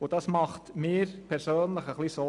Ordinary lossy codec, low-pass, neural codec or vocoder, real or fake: none; 10.8 kHz; none; real